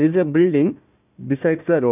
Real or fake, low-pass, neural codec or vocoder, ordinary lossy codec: fake; 3.6 kHz; codec, 16 kHz, 1 kbps, FunCodec, trained on Chinese and English, 50 frames a second; none